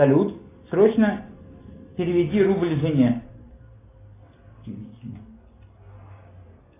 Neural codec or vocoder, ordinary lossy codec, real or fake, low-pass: none; AAC, 24 kbps; real; 3.6 kHz